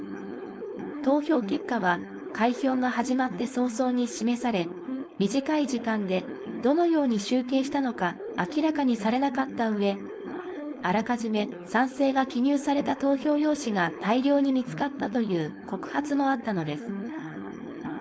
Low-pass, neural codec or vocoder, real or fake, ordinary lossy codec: none; codec, 16 kHz, 4.8 kbps, FACodec; fake; none